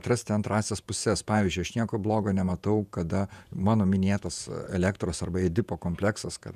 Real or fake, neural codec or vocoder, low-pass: fake; vocoder, 48 kHz, 128 mel bands, Vocos; 14.4 kHz